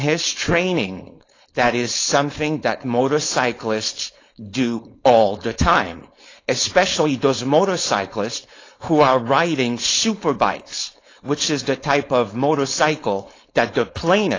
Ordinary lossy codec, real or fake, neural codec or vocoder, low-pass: AAC, 32 kbps; fake; codec, 16 kHz, 4.8 kbps, FACodec; 7.2 kHz